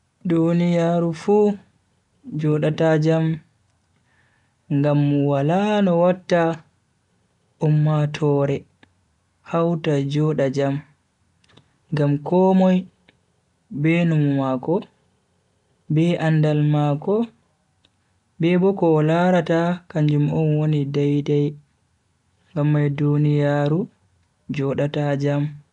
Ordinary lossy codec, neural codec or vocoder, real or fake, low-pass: none; none; real; 10.8 kHz